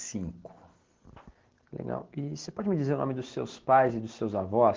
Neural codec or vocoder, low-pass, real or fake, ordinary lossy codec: none; 7.2 kHz; real; Opus, 16 kbps